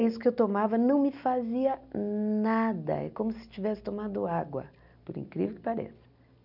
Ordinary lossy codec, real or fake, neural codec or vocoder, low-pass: none; real; none; 5.4 kHz